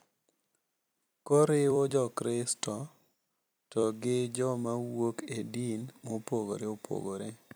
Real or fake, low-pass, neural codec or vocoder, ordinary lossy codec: fake; none; vocoder, 44.1 kHz, 128 mel bands every 256 samples, BigVGAN v2; none